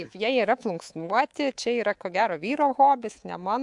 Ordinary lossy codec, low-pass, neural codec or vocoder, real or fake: AAC, 64 kbps; 10.8 kHz; codec, 24 kHz, 3.1 kbps, DualCodec; fake